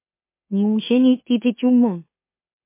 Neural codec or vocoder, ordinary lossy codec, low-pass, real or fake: autoencoder, 44.1 kHz, a latent of 192 numbers a frame, MeloTTS; MP3, 24 kbps; 3.6 kHz; fake